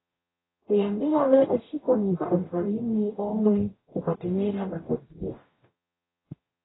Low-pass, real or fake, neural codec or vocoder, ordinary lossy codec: 7.2 kHz; fake; codec, 44.1 kHz, 0.9 kbps, DAC; AAC, 16 kbps